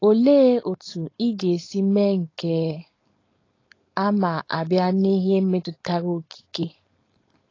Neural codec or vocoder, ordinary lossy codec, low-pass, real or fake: codec, 16 kHz, 4.8 kbps, FACodec; AAC, 32 kbps; 7.2 kHz; fake